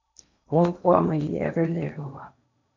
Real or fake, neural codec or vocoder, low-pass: fake; codec, 16 kHz in and 24 kHz out, 0.8 kbps, FocalCodec, streaming, 65536 codes; 7.2 kHz